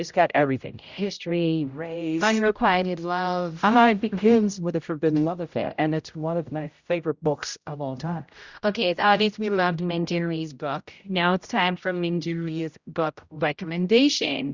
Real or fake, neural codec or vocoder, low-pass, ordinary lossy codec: fake; codec, 16 kHz, 0.5 kbps, X-Codec, HuBERT features, trained on general audio; 7.2 kHz; Opus, 64 kbps